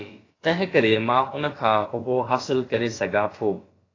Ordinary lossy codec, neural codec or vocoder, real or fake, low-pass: AAC, 32 kbps; codec, 16 kHz, about 1 kbps, DyCAST, with the encoder's durations; fake; 7.2 kHz